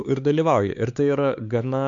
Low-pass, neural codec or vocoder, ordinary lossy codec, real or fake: 7.2 kHz; codec, 16 kHz, 4 kbps, X-Codec, HuBERT features, trained on LibriSpeech; MP3, 64 kbps; fake